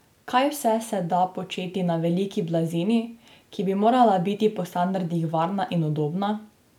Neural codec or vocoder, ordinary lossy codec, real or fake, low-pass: none; none; real; 19.8 kHz